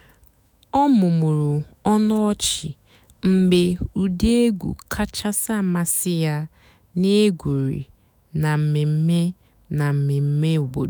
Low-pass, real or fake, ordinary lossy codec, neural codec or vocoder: none; fake; none; autoencoder, 48 kHz, 128 numbers a frame, DAC-VAE, trained on Japanese speech